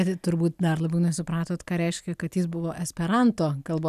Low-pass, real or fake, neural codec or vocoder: 14.4 kHz; real; none